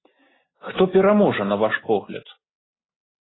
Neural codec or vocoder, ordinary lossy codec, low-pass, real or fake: none; AAC, 16 kbps; 7.2 kHz; real